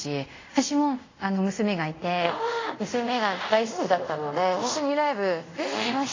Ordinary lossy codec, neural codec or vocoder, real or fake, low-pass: AAC, 32 kbps; codec, 24 kHz, 0.5 kbps, DualCodec; fake; 7.2 kHz